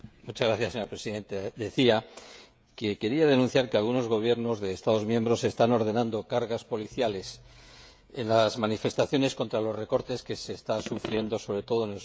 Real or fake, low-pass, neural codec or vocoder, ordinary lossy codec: fake; none; codec, 16 kHz, 16 kbps, FreqCodec, smaller model; none